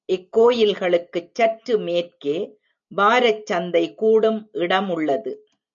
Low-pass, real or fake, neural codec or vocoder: 7.2 kHz; real; none